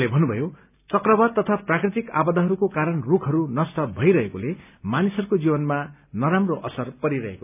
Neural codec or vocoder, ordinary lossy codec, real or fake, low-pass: none; none; real; 3.6 kHz